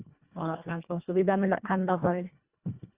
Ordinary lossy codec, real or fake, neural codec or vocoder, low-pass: Opus, 64 kbps; fake; codec, 24 kHz, 1.5 kbps, HILCodec; 3.6 kHz